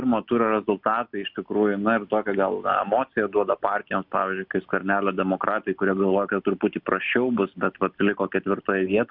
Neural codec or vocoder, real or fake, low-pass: none; real; 5.4 kHz